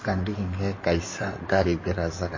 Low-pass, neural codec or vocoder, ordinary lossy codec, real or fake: 7.2 kHz; codec, 16 kHz in and 24 kHz out, 2.2 kbps, FireRedTTS-2 codec; MP3, 32 kbps; fake